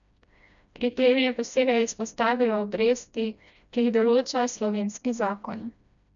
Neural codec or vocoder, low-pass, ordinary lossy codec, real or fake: codec, 16 kHz, 1 kbps, FreqCodec, smaller model; 7.2 kHz; none; fake